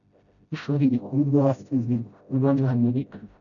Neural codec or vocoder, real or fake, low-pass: codec, 16 kHz, 0.5 kbps, FreqCodec, smaller model; fake; 7.2 kHz